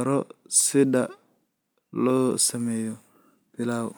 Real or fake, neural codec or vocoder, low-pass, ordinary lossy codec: real; none; none; none